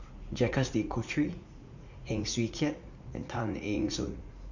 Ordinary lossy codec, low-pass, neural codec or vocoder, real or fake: none; 7.2 kHz; vocoder, 44.1 kHz, 80 mel bands, Vocos; fake